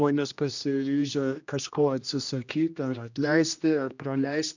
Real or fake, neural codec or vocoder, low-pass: fake; codec, 16 kHz, 1 kbps, X-Codec, HuBERT features, trained on general audio; 7.2 kHz